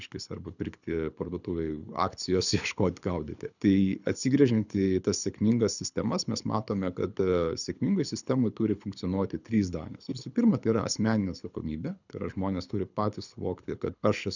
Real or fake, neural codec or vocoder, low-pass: fake; codec, 24 kHz, 6 kbps, HILCodec; 7.2 kHz